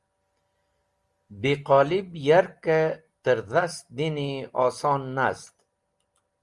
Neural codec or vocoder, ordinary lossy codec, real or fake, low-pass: none; Opus, 32 kbps; real; 10.8 kHz